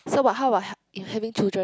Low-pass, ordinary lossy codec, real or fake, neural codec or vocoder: none; none; real; none